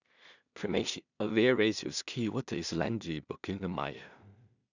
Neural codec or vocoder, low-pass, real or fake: codec, 16 kHz in and 24 kHz out, 0.4 kbps, LongCat-Audio-Codec, two codebook decoder; 7.2 kHz; fake